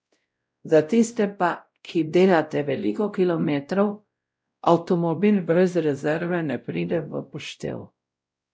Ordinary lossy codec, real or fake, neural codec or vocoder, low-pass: none; fake; codec, 16 kHz, 0.5 kbps, X-Codec, WavLM features, trained on Multilingual LibriSpeech; none